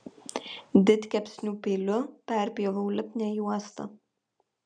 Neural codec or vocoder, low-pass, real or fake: none; 9.9 kHz; real